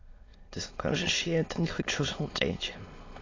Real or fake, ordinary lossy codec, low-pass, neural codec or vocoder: fake; AAC, 32 kbps; 7.2 kHz; autoencoder, 22.05 kHz, a latent of 192 numbers a frame, VITS, trained on many speakers